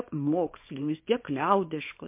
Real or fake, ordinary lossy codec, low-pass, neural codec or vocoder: fake; MP3, 24 kbps; 3.6 kHz; codec, 24 kHz, 0.9 kbps, WavTokenizer, small release